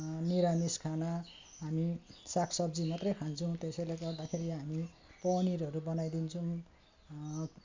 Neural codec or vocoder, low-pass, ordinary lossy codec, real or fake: none; 7.2 kHz; MP3, 64 kbps; real